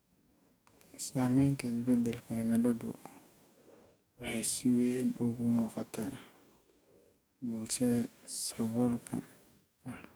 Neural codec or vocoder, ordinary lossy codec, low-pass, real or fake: codec, 44.1 kHz, 2.6 kbps, DAC; none; none; fake